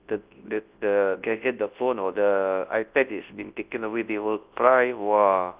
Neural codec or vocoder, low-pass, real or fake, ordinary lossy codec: codec, 24 kHz, 0.9 kbps, WavTokenizer, large speech release; 3.6 kHz; fake; Opus, 32 kbps